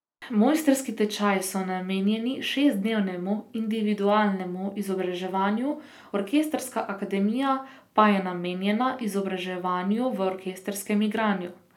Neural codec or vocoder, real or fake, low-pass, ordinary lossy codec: none; real; 19.8 kHz; none